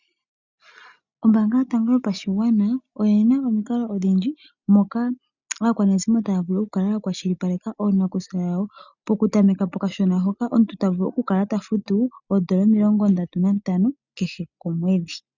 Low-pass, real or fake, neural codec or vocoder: 7.2 kHz; real; none